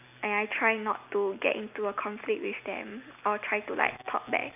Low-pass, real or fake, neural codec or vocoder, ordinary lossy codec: 3.6 kHz; real; none; none